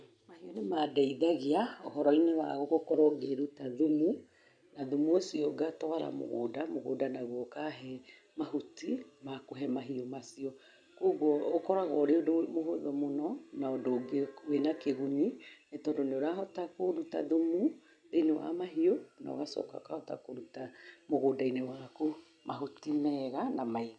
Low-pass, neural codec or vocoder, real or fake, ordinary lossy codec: 9.9 kHz; none; real; none